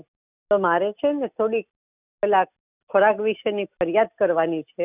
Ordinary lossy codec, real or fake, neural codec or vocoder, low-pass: none; real; none; 3.6 kHz